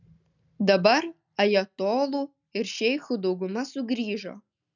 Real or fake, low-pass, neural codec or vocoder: real; 7.2 kHz; none